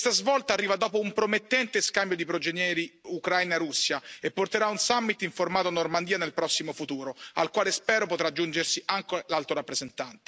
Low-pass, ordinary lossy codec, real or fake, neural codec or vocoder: none; none; real; none